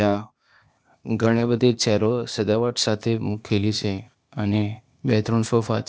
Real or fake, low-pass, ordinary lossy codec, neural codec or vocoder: fake; none; none; codec, 16 kHz, 0.8 kbps, ZipCodec